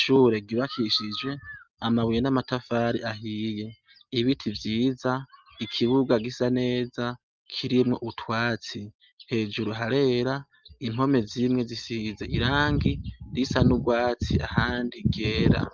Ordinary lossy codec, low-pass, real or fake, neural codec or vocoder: Opus, 24 kbps; 7.2 kHz; real; none